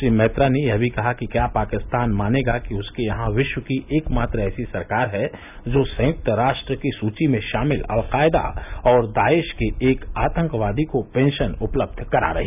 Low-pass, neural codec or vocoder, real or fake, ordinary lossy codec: 3.6 kHz; none; real; none